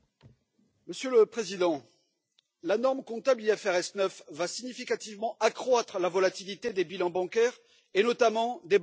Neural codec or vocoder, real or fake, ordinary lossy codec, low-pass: none; real; none; none